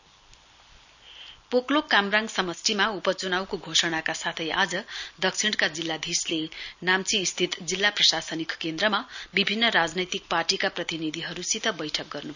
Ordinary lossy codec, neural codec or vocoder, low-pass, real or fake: none; none; 7.2 kHz; real